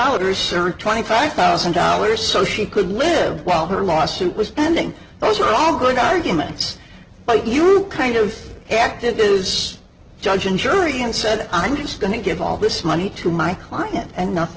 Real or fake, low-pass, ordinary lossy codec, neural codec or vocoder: real; 7.2 kHz; Opus, 16 kbps; none